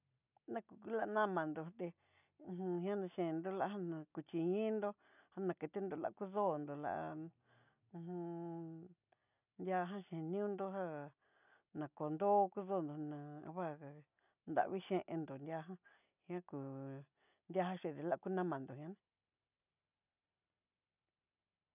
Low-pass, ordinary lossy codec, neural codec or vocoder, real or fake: 3.6 kHz; none; none; real